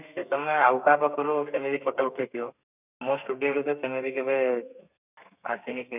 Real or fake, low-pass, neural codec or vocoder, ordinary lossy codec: fake; 3.6 kHz; codec, 32 kHz, 1.9 kbps, SNAC; none